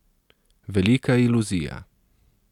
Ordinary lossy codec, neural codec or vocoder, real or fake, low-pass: none; none; real; 19.8 kHz